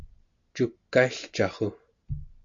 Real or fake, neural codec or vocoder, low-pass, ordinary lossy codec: real; none; 7.2 kHz; MP3, 48 kbps